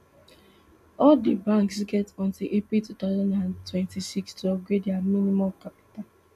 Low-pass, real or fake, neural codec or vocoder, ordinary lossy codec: 14.4 kHz; real; none; none